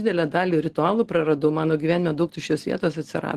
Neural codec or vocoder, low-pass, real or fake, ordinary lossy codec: none; 14.4 kHz; real; Opus, 16 kbps